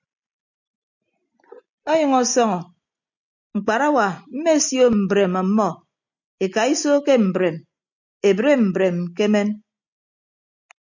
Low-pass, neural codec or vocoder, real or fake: 7.2 kHz; none; real